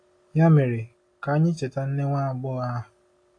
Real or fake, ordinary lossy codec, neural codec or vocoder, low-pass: real; AAC, 48 kbps; none; 9.9 kHz